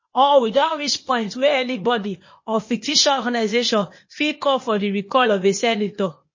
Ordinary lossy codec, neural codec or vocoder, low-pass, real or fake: MP3, 32 kbps; codec, 16 kHz, 0.8 kbps, ZipCodec; 7.2 kHz; fake